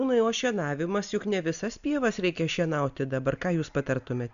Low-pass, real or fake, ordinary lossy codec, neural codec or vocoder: 7.2 kHz; real; MP3, 96 kbps; none